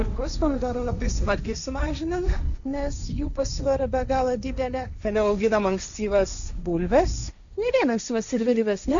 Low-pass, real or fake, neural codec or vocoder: 7.2 kHz; fake; codec, 16 kHz, 1.1 kbps, Voila-Tokenizer